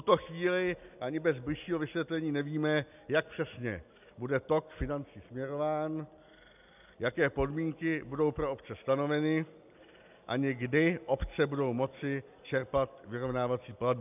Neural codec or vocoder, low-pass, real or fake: none; 3.6 kHz; real